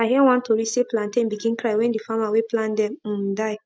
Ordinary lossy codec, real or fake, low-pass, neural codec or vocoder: none; real; none; none